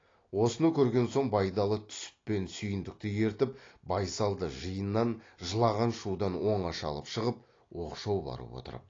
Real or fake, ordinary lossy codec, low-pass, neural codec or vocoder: real; AAC, 32 kbps; 7.2 kHz; none